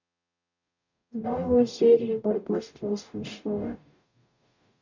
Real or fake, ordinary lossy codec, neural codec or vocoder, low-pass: fake; none; codec, 44.1 kHz, 0.9 kbps, DAC; 7.2 kHz